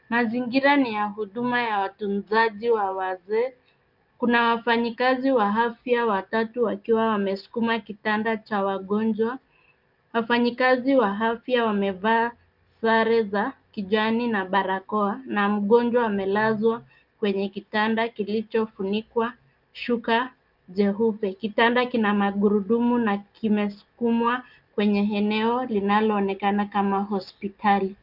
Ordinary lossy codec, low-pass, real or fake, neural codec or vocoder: Opus, 24 kbps; 5.4 kHz; real; none